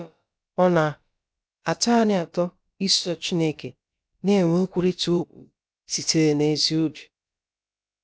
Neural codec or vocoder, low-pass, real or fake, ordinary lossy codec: codec, 16 kHz, about 1 kbps, DyCAST, with the encoder's durations; none; fake; none